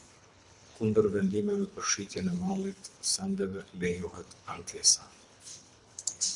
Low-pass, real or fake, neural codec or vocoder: 10.8 kHz; fake; codec, 24 kHz, 3 kbps, HILCodec